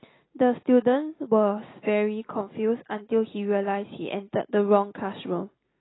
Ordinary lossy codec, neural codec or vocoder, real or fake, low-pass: AAC, 16 kbps; none; real; 7.2 kHz